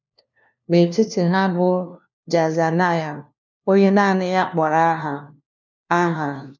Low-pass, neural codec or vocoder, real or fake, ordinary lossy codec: 7.2 kHz; codec, 16 kHz, 1 kbps, FunCodec, trained on LibriTTS, 50 frames a second; fake; none